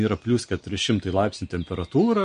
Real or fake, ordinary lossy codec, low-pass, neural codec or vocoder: real; MP3, 48 kbps; 9.9 kHz; none